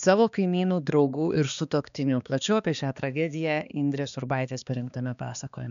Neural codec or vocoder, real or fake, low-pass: codec, 16 kHz, 2 kbps, X-Codec, HuBERT features, trained on balanced general audio; fake; 7.2 kHz